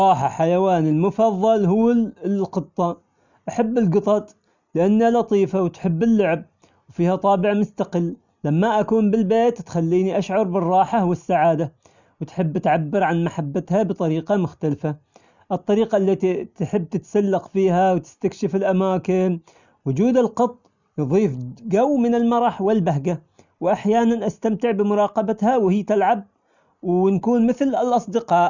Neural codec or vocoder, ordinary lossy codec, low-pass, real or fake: none; none; 7.2 kHz; real